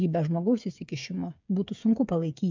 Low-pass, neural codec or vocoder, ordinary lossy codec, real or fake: 7.2 kHz; codec, 16 kHz, 8 kbps, FreqCodec, smaller model; MP3, 64 kbps; fake